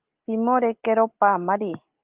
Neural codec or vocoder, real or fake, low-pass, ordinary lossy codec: none; real; 3.6 kHz; Opus, 32 kbps